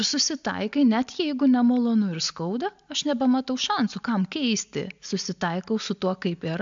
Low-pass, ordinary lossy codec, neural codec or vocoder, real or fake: 7.2 kHz; MP3, 64 kbps; none; real